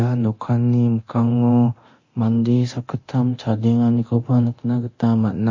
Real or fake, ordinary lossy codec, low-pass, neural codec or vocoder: fake; MP3, 32 kbps; 7.2 kHz; codec, 24 kHz, 0.9 kbps, DualCodec